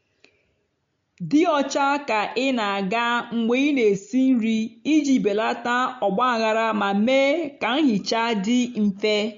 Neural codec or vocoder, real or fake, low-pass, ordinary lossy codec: none; real; 7.2 kHz; MP3, 48 kbps